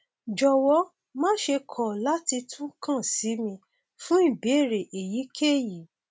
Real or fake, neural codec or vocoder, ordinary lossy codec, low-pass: real; none; none; none